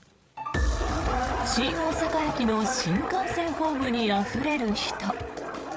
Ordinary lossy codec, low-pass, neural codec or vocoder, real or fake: none; none; codec, 16 kHz, 8 kbps, FreqCodec, larger model; fake